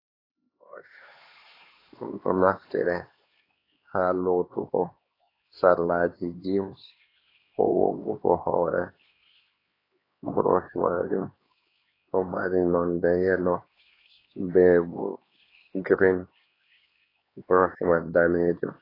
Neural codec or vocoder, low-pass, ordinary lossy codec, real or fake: codec, 16 kHz, 2 kbps, X-Codec, HuBERT features, trained on LibriSpeech; 5.4 kHz; AAC, 24 kbps; fake